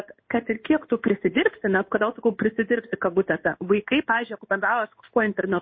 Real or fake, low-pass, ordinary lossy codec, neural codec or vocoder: fake; 7.2 kHz; MP3, 24 kbps; codec, 16 kHz in and 24 kHz out, 1 kbps, XY-Tokenizer